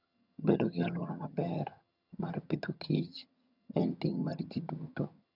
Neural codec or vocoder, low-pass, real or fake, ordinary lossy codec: vocoder, 22.05 kHz, 80 mel bands, HiFi-GAN; 5.4 kHz; fake; none